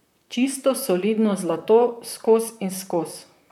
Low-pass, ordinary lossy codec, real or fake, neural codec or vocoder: 19.8 kHz; none; fake; vocoder, 44.1 kHz, 128 mel bands, Pupu-Vocoder